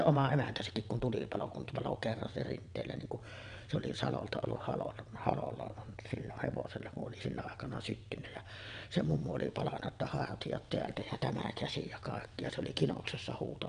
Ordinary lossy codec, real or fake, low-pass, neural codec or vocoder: none; fake; 9.9 kHz; vocoder, 22.05 kHz, 80 mel bands, WaveNeXt